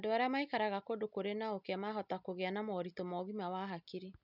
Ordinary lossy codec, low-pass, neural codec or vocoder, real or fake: none; 5.4 kHz; none; real